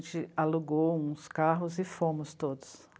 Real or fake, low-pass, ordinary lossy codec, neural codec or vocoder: real; none; none; none